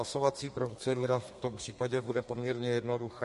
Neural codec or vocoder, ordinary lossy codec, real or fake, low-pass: codec, 32 kHz, 1.9 kbps, SNAC; MP3, 48 kbps; fake; 14.4 kHz